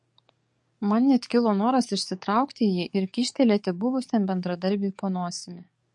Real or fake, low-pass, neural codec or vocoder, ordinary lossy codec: fake; 10.8 kHz; codec, 44.1 kHz, 7.8 kbps, DAC; MP3, 48 kbps